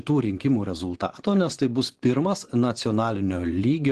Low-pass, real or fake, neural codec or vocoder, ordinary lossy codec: 10.8 kHz; fake; vocoder, 24 kHz, 100 mel bands, Vocos; Opus, 16 kbps